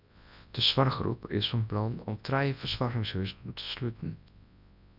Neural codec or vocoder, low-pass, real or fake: codec, 24 kHz, 0.9 kbps, WavTokenizer, large speech release; 5.4 kHz; fake